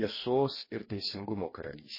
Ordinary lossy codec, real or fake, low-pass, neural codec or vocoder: MP3, 24 kbps; fake; 5.4 kHz; codec, 44.1 kHz, 2.6 kbps, DAC